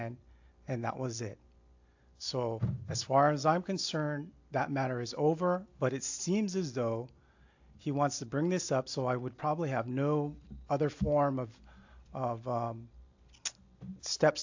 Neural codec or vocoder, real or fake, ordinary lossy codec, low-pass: none; real; AAC, 48 kbps; 7.2 kHz